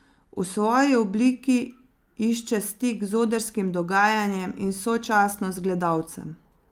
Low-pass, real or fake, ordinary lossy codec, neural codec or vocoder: 19.8 kHz; real; Opus, 32 kbps; none